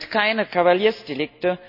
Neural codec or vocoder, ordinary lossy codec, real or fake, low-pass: none; none; real; 5.4 kHz